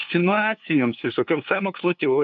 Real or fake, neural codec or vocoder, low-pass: fake; codec, 16 kHz, 2 kbps, FunCodec, trained on LibriTTS, 25 frames a second; 7.2 kHz